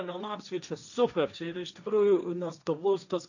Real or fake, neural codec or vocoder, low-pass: fake; codec, 16 kHz, 1.1 kbps, Voila-Tokenizer; 7.2 kHz